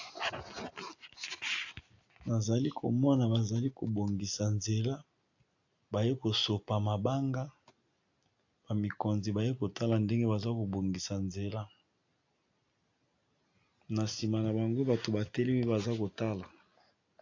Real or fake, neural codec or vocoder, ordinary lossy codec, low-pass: real; none; AAC, 48 kbps; 7.2 kHz